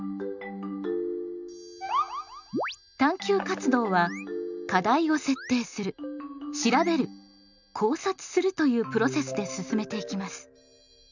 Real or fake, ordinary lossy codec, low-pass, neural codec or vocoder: real; none; 7.2 kHz; none